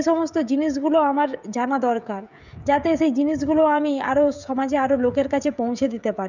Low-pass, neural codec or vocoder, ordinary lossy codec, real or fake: 7.2 kHz; codec, 16 kHz, 16 kbps, FreqCodec, smaller model; none; fake